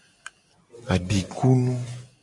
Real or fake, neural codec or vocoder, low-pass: real; none; 10.8 kHz